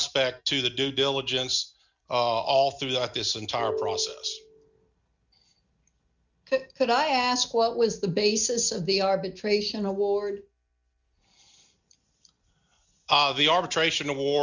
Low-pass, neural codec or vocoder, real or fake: 7.2 kHz; none; real